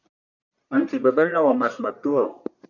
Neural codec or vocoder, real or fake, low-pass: codec, 44.1 kHz, 1.7 kbps, Pupu-Codec; fake; 7.2 kHz